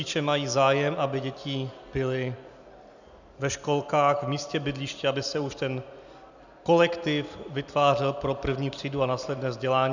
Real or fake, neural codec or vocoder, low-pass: real; none; 7.2 kHz